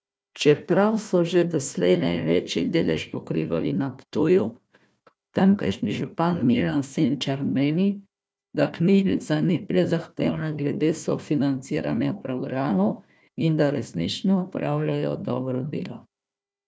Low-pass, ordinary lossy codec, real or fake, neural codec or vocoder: none; none; fake; codec, 16 kHz, 1 kbps, FunCodec, trained on Chinese and English, 50 frames a second